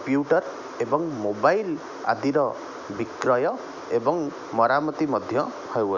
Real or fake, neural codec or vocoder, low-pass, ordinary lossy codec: real; none; 7.2 kHz; none